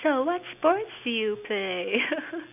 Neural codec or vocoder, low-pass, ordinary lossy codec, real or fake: none; 3.6 kHz; none; real